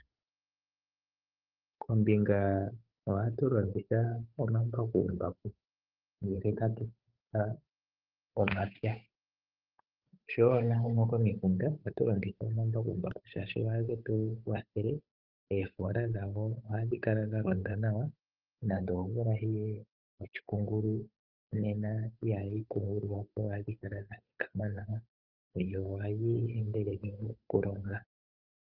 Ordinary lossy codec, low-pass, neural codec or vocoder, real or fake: Opus, 24 kbps; 5.4 kHz; codec, 16 kHz, 8 kbps, FunCodec, trained on Chinese and English, 25 frames a second; fake